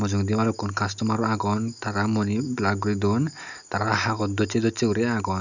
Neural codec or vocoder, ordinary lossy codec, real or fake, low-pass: none; none; real; 7.2 kHz